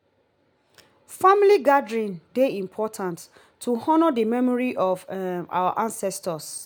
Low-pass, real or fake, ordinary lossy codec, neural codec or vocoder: none; real; none; none